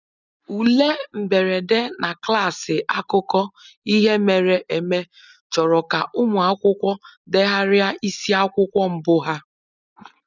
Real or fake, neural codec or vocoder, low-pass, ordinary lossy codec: real; none; 7.2 kHz; none